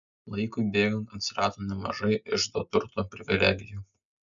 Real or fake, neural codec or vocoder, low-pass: real; none; 7.2 kHz